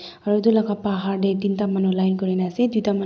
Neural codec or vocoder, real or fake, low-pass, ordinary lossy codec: none; real; none; none